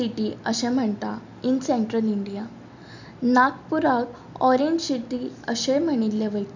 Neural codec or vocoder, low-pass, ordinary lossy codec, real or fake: none; 7.2 kHz; none; real